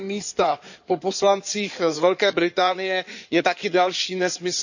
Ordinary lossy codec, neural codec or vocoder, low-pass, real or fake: none; codec, 16 kHz in and 24 kHz out, 2.2 kbps, FireRedTTS-2 codec; 7.2 kHz; fake